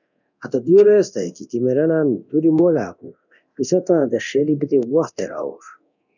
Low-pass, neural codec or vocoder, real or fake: 7.2 kHz; codec, 24 kHz, 0.9 kbps, DualCodec; fake